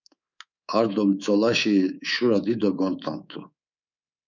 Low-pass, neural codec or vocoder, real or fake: 7.2 kHz; codec, 24 kHz, 3.1 kbps, DualCodec; fake